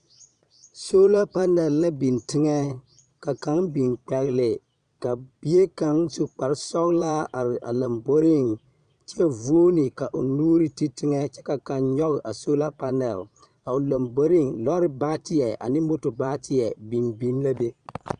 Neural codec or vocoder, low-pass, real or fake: vocoder, 22.05 kHz, 80 mel bands, Vocos; 9.9 kHz; fake